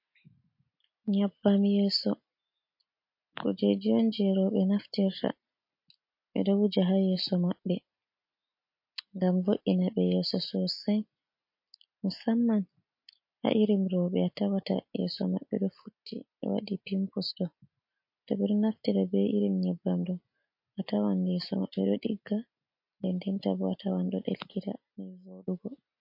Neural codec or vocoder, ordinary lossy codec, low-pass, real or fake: none; MP3, 32 kbps; 5.4 kHz; real